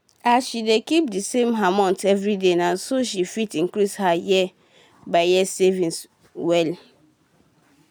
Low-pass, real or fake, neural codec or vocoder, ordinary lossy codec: none; real; none; none